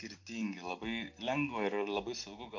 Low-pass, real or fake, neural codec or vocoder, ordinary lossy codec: 7.2 kHz; real; none; MP3, 64 kbps